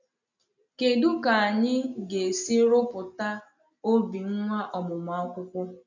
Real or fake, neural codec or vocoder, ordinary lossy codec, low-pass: real; none; none; 7.2 kHz